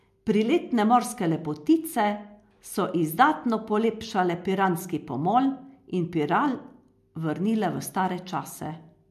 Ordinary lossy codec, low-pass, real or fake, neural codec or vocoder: MP3, 64 kbps; 14.4 kHz; real; none